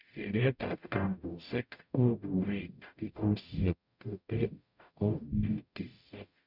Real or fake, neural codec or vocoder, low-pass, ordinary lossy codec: fake; codec, 44.1 kHz, 0.9 kbps, DAC; 5.4 kHz; none